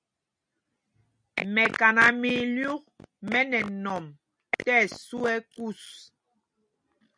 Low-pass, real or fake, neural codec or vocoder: 9.9 kHz; real; none